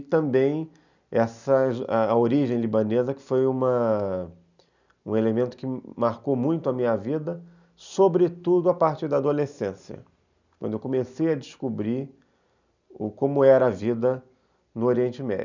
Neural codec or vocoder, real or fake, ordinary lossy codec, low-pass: none; real; none; 7.2 kHz